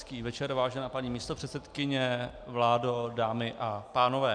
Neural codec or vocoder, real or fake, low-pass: none; real; 9.9 kHz